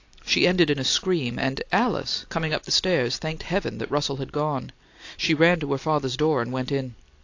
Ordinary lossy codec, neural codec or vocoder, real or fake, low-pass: AAC, 48 kbps; none; real; 7.2 kHz